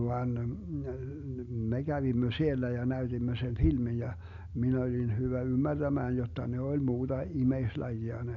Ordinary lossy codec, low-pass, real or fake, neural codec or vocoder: none; 7.2 kHz; real; none